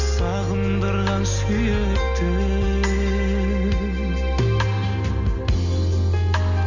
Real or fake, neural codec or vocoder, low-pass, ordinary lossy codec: real; none; 7.2 kHz; none